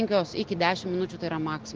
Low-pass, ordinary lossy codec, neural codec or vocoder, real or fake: 7.2 kHz; Opus, 16 kbps; none; real